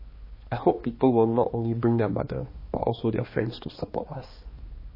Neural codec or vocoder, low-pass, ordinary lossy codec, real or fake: codec, 16 kHz, 2 kbps, X-Codec, HuBERT features, trained on balanced general audio; 5.4 kHz; MP3, 24 kbps; fake